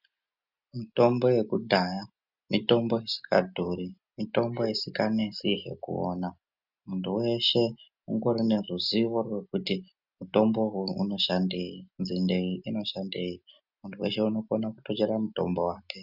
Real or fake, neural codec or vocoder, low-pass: real; none; 5.4 kHz